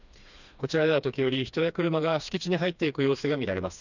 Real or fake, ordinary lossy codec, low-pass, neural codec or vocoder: fake; none; 7.2 kHz; codec, 16 kHz, 2 kbps, FreqCodec, smaller model